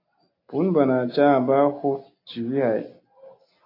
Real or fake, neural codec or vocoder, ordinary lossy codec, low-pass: real; none; AAC, 32 kbps; 5.4 kHz